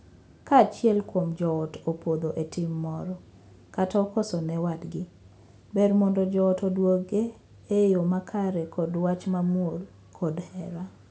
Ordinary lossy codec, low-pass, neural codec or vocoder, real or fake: none; none; none; real